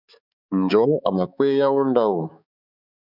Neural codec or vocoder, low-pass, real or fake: codec, 16 kHz, 4 kbps, X-Codec, HuBERT features, trained on balanced general audio; 5.4 kHz; fake